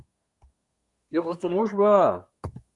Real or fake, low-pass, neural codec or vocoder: fake; 10.8 kHz; codec, 24 kHz, 1 kbps, SNAC